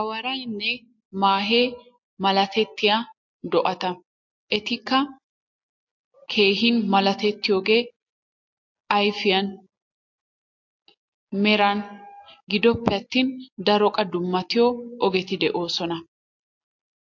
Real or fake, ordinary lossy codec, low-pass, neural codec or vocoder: real; MP3, 48 kbps; 7.2 kHz; none